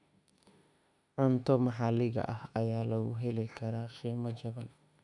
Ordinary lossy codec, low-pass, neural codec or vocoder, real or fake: none; 10.8 kHz; autoencoder, 48 kHz, 32 numbers a frame, DAC-VAE, trained on Japanese speech; fake